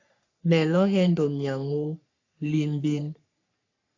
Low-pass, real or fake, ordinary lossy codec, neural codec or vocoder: 7.2 kHz; fake; AAC, 48 kbps; codec, 44.1 kHz, 3.4 kbps, Pupu-Codec